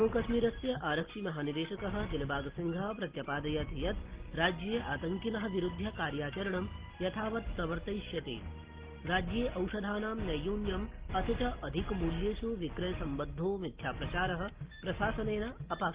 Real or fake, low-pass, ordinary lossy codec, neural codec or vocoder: real; 3.6 kHz; Opus, 16 kbps; none